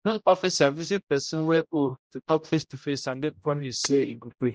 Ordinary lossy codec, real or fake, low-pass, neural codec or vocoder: none; fake; none; codec, 16 kHz, 0.5 kbps, X-Codec, HuBERT features, trained on general audio